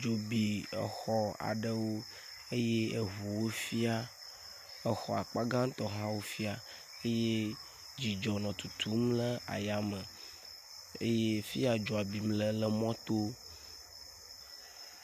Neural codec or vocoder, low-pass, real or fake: none; 14.4 kHz; real